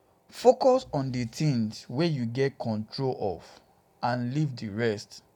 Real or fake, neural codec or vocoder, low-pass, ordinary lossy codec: real; none; 19.8 kHz; none